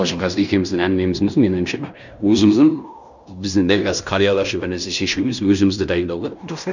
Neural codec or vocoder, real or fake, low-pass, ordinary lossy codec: codec, 16 kHz in and 24 kHz out, 0.9 kbps, LongCat-Audio-Codec, fine tuned four codebook decoder; fake; 7.2 kHz; none